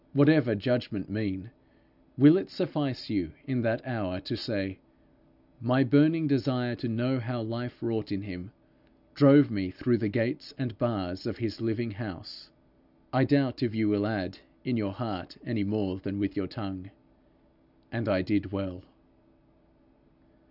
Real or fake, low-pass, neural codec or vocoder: real; 5.4 kHz; none